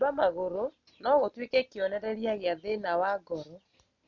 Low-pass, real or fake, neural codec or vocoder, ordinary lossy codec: 7.2 kHz; real; none; none